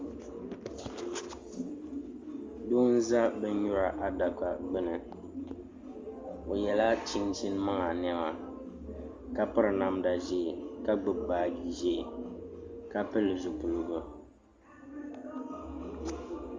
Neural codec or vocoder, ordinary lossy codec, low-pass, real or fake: none; Opus, 32 kbps; 7.2 kHz; real